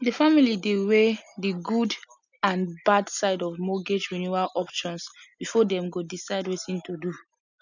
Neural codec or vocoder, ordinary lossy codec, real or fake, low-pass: none; none; real; 7.2 kHz